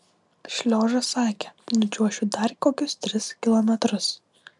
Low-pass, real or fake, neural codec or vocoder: 10.8 kHz; real; none